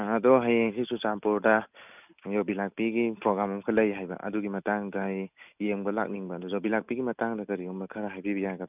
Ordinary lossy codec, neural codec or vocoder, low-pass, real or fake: none; none; 3.6 kHz; real